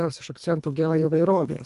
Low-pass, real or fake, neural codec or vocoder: 10.8 kHz; fake; codec, 24 kHz, 1.5 kbps, HILCodec